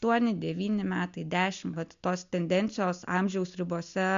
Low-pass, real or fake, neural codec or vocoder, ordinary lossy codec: 7.2 kHz; real; none; MP3, 64 kbps